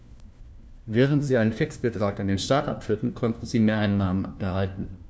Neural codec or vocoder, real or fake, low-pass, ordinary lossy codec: codec, 16 kHz, 1 kbps, FunCodec, trained on LibriTTS, 50 frames a second; fake; none; none